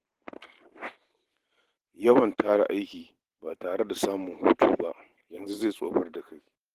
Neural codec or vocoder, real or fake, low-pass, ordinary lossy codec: vocoder, 44.1 kHz, 128 mel bands every 512 samples, BigVGAN v2; fake; 14.4 kHz; Opus, 16 kbps